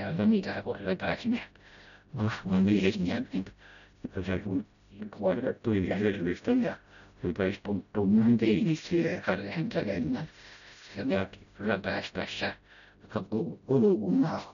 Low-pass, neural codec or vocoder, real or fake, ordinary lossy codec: 7.2 kHz; codec, 16 kHz, 0.5 kbps, FreqCodec, smaller model; fake; none